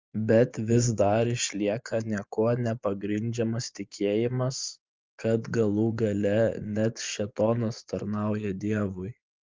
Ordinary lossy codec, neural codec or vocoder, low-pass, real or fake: Opus, 32 kbps; vocoder, 24 kHz, 100 mel bands, Vocos; 7.2 kHz; fake